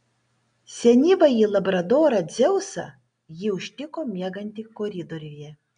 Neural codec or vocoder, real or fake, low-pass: none; real; 9.9 kHz